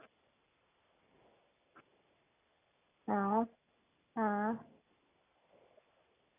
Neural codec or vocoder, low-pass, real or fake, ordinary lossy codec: none; 3.6 kHz; real; none